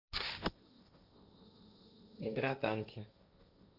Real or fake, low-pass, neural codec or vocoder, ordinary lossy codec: fake; 5.4 kHz; codec, 16 kHz, 1.1 kbps, Voila-Tokenizer; none